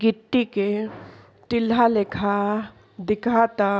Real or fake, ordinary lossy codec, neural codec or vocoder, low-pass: real; none; none; none